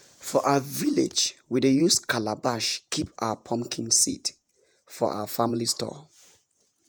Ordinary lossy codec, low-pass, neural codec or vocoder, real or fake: none; none; vocoder, 48 kHz, 128 mel bands, Vocos; fake